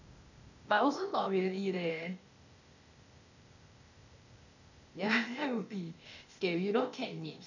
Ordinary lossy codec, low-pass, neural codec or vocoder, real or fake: none; 7.2 kHz; codec, 16 kHz, 0.8 kbps, ZipCodec; fake